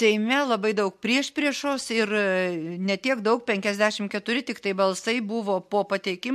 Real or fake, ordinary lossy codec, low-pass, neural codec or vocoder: real; MP3, 64 kbps; 14.4 kHz; none